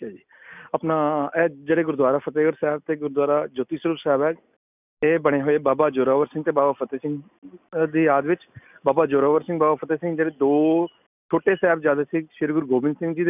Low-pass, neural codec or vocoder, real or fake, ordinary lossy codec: 3.6 kHz; none; real; none